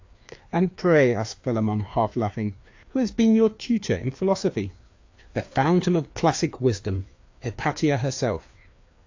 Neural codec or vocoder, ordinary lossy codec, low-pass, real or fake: codec, 16 kHz, 2 kbps, FreqCodec, larger model; AAC, 48 kbps; 7.2 kHz; fake